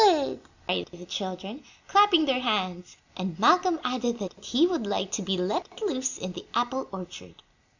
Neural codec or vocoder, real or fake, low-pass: none; real; 7.2 kHz